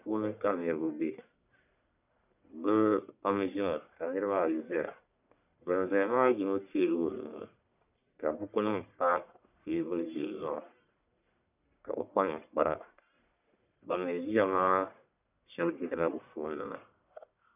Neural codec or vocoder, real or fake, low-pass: codec, 44.1 kHz, 1.7 kbps, Pupu-Codec; fake; 3.6 kHz